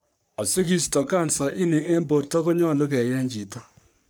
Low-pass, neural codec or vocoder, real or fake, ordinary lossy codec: none; codec, 44.1 kHz, 3.4 kbps, Pupu-Codec; fake; none